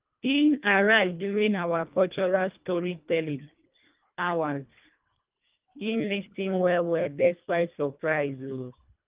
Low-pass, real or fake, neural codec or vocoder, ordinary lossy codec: 3.6 kHz; fake; codec, 24 kHz, 1.5 kbps, HILCodec; Opus, 32 kbps